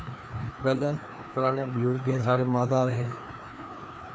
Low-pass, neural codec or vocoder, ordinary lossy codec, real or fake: none; codec, 16 kHz, 2 kbps, FreqCodec, larger model; none; fake